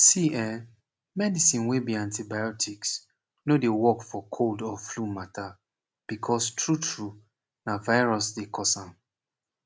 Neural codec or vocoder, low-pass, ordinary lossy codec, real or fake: none; none; none; real